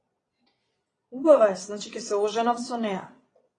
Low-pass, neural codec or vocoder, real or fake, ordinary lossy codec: 9.9 kHz; vocoder, 22.05 kHz, 80 mel bands, Vocos; fake; AAC, 32 kbps